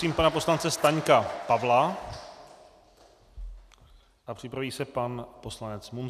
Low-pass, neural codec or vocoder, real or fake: 14.4 kHz; none; real